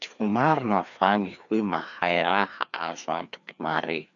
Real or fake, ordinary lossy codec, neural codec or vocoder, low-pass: fake; none; codec, 16 kHz, 2 kbps, FreqCodec, larger model; 7.2 kHz